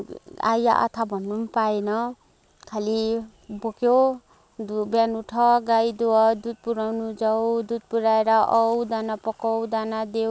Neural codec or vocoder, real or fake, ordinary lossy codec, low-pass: none; real; none; none